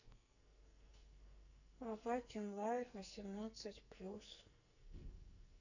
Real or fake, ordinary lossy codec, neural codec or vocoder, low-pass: fake; none; codec, 44.1 kHz, 2.6 kbps, SNAC; 7.2 kHz